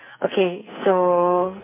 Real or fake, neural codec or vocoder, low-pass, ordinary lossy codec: fake; codec, 44.1 kHz, 2.6 kbps, SNAC; 3.6 kHz; MP3, 32 kbps